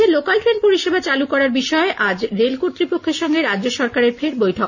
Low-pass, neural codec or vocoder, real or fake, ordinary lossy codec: 7.2 kHz; none; real; none